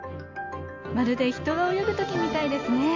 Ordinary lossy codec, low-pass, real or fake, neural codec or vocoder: MP3, 48 kbps; 7.2 kHz; real; none